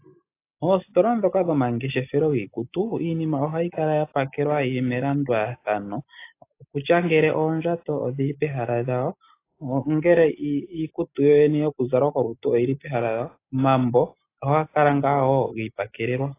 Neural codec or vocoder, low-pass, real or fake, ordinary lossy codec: none; 3.6 kHz; real; AAC, 24 kbps